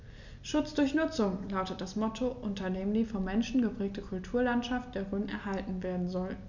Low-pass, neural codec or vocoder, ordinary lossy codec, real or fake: 7.2 kHz; none; none; real